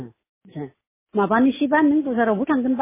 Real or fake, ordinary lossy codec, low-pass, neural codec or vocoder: real; MP3, 16 kbps; 3.6 kHz; none